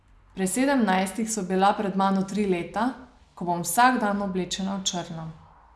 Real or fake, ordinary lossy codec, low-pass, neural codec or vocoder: real; none; none; none